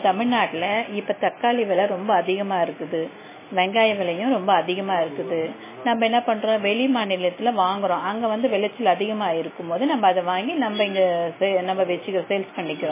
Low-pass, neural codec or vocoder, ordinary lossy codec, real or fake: 3.6 kHz; none; MP3, 16 kbps; real